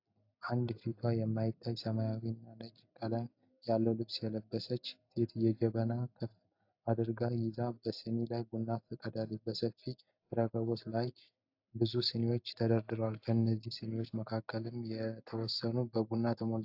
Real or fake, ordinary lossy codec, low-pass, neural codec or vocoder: real; AAC, 48 kbps; 5.4 kHz; none